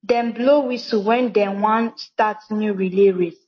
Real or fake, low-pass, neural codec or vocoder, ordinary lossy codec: real; 7.2 kHz; none; MP3, 32 kbps